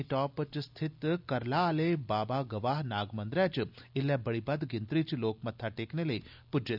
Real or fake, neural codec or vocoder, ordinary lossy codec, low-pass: real; none; none; 5.4 kHz